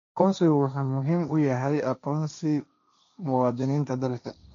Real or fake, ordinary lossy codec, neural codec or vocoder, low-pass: fake; MP3, 64 kbps; codec, 16 kHz, 1.1 kbps, Voila-Tokenizer; 7.2 kHz